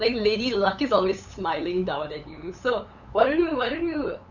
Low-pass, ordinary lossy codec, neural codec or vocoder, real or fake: 7.2 kHz; none; codec, 16 kHz, 8 kbps, FunCodec, trained on LibriTTS, 25 frames a second; fake